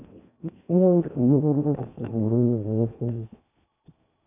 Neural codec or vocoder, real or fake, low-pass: codec, 16 kHz in and 24 kHz out, 0.8 kbps, FocalCodec, streaming, 65536 codes; fake; 3.6 kHz